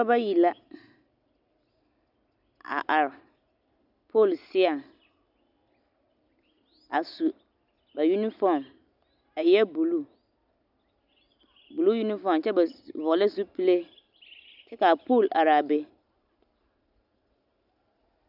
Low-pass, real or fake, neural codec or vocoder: 5.4 kHz; real; none